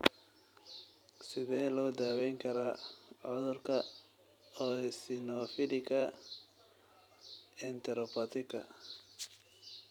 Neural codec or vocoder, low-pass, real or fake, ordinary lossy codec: vocoder, 44.1 kHz, 128 mel bands every 512 samples, BigVGAN v2; 19.8 kHz; fake; none